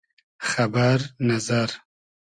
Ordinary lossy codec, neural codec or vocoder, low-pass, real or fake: Opus, 64 kbps; none; 9.9 kHz; real